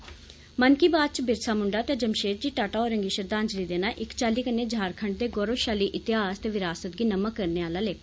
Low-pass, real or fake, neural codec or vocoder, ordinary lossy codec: none; real; none; none